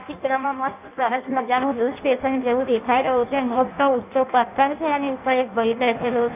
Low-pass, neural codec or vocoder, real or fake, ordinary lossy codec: 3.6 kHz; codec, 16 kHz in and 24 kHz out, 0.6 kbps, FireRedTTS-2 codec; fake; none